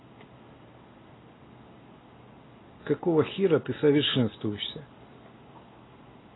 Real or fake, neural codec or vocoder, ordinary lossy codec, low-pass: real; none; AAC, 16 kbps; 7.2 kHz